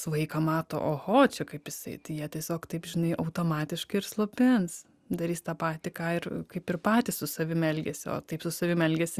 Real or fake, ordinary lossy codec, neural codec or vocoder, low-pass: real; Opus, 64 kbps; none; 14.4 kHz